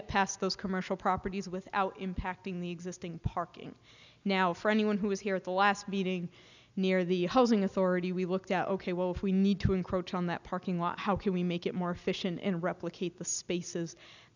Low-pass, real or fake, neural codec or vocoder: 7.2 kHz; real; none